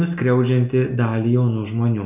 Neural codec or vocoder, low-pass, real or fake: none; 3.6 kHz; real